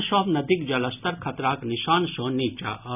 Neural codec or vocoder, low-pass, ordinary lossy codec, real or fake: none; 3.6 kHz; none; real